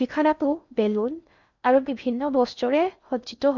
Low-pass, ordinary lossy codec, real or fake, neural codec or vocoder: 7.2 kHz; none; fake; codec, 16 kHz in and 24 kHz out, 0.6 kbps, FocalCodec, streaming, 2048 codes